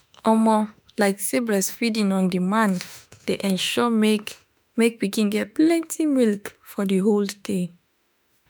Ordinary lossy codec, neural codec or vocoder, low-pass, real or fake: none; autoencoder, 48 kHz, 32 numbers a frame, DAC-VAE, trained on Japanese speech; none; fake